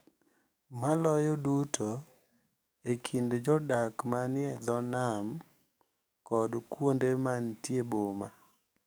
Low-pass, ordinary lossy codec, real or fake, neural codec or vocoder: none; none; fake; codec, 44.1 kHz, 7.8 kbps, DAC